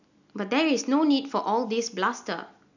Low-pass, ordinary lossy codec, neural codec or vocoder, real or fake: 7.2 kHz; none; none; real